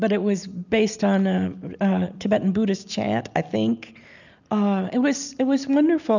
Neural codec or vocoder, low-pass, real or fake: none; 7.2 kHz; real